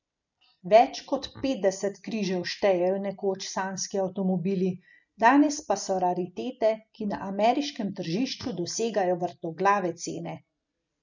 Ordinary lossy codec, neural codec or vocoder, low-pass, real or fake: none; none; 7.2 kHz; real